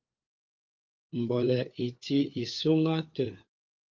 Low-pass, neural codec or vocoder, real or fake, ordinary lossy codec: 7.2 kHz; codec, 16 kHz, 4 kbps, FunCodec, trained on LibriTTS, 50 frames a second; fake; Opus, 24 kbps